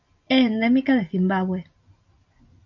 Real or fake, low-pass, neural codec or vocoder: real; 7.2 kHz; none